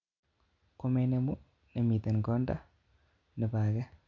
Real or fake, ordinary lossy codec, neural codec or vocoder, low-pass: real; none; none; 7.2 kHz